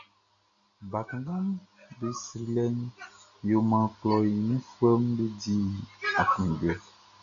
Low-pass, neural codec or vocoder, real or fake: 7.2 kHz; none; real